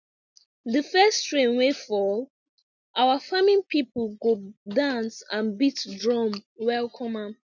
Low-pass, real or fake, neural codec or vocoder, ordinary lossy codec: 7.2 kHz; real; none; none